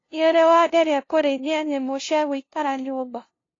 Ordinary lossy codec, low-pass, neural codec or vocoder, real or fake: AAC, 32 kbps; 7.2 kHz; codec, 16 kHz, 0.5 kbps, FunCodec, trained on LibriTTS, 25 frames a second; fake